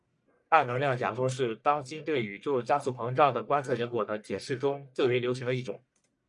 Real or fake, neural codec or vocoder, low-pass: fake; codec, 44.1 kHz, 1.7 kbps, Pupu-Codec; 10.8 kHz